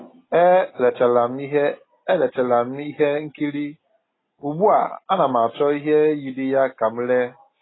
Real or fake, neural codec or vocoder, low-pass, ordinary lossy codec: real; none; 7.2 kHz; AAC, 16 kbps